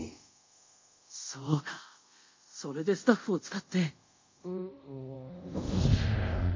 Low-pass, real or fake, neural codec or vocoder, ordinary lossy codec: 7.2 kHz; fake; codec, 24 kHz, 0.5 kbps, DualCodec; MP3, 48 kbps